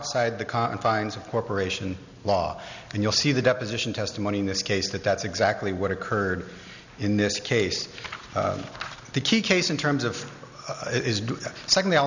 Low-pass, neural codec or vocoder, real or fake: 7.2 kHz; none; real